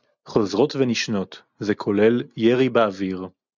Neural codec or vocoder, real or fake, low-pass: none; real; 7.2 kHz